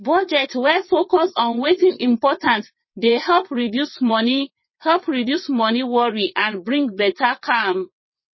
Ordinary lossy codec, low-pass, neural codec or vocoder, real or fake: MP3, 24 kbps; 7.2 kHz; vocoder, 22.05 kHz, 80 mel bands, WaveNeXt; fake